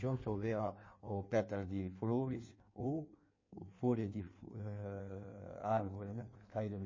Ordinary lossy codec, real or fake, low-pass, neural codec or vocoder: MP3, 32 kbps; fake; 7.2 kHz; codec, 16 kHz in and 24 kHz out, 1.1 kbps, FireRedTTS-2 codec